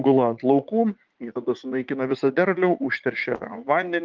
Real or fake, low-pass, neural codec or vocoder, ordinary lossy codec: fake; 7.2 kHz; vocoder, 44.1 kHz, 80 mel bands, Vocos; Opus, 32 kbps